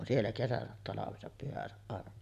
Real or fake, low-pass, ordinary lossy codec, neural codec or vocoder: real; 14.4 kHz; none; none